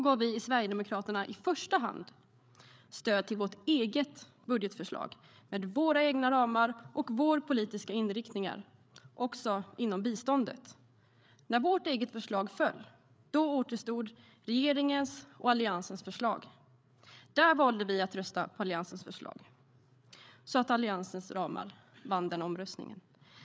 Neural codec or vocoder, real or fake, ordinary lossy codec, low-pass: codec, 16 kHz, 8 kbps, FreqCodec, larger model; fake; none; none